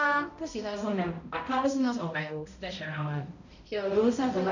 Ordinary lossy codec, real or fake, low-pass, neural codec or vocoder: none; fake; 7.2 kHz; codec, 16 kHz, 0.5 kbps, X-Codec, HuBERT features, trained on balanced general audio